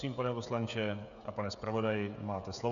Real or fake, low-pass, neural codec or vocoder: fake; 7.2 kHz; codec, 16 kHz, 16 kbps, FreqCodec, smaller model